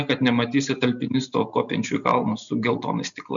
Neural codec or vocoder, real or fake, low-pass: none; real; 7.2 kHz